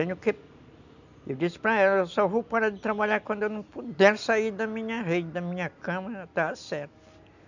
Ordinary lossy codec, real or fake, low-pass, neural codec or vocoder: none; real; 7.2 kHz; none